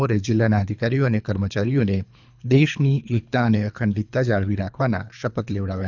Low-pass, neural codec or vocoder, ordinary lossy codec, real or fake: 7.2 kHz; codec, 24 kHz, 3 kbps, HILCodec; none; fake